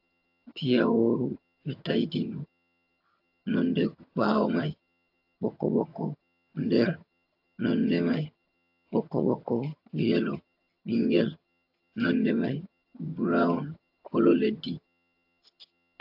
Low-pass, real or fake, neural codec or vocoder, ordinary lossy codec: 5.4 kHz; fake; vocoder, 22.05 kHz, 80 mel bands, HiFi-GAN; AAC, 48 kbps